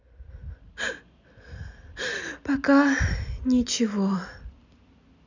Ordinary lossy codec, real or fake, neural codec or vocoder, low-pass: none; real; none; 7.2 kHz